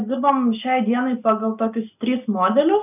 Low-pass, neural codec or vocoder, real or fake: 3.6 kHz; none; real